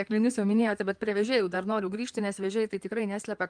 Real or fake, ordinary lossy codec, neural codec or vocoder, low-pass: fake; Opus, 32 kbps; codec, 16 kHz in and 24 kHz out, 2.2 kbps, FireRedTTS-2 codec; 9.9 kHz